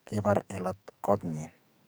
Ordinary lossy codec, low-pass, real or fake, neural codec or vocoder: none; none; fake; codec, 44.1 kHz, 2.6 kbps, SNAC